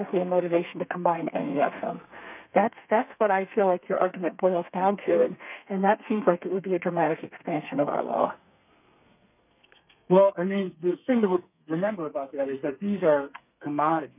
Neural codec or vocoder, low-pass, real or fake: codec, 32 kHz, 1.9 kbps, SNAC; 3.6 kHz; fake